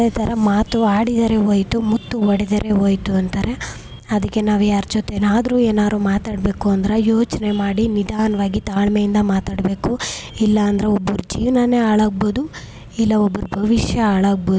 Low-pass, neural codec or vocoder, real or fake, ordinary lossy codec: none; none; real; none